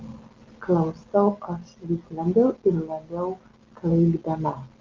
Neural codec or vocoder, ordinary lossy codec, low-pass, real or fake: none; Opus, 24 kbps; 7.2 kHz; real